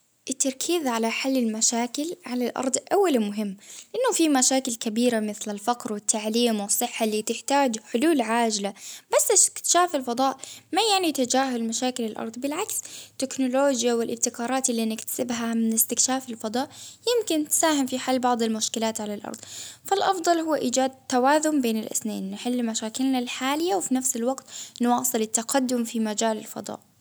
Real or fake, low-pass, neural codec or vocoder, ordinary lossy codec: real; none; none; none